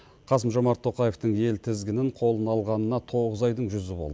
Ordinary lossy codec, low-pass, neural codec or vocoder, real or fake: none; none; none; real